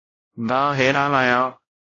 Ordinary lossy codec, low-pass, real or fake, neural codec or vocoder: AAC, 32 kbps; 7.2 kHz; fake; codec, 16 kHz, 0.5 kbps, X-Codec, WavLM features, trained on Multilingual LibriSpeech